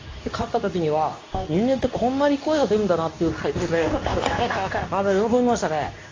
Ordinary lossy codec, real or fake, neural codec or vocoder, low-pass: AAC, 32 kbps; fake; codec, 24 kHz, 0.9 kbps, WavTokenizer, medium speech release version 2; 7.2 kHz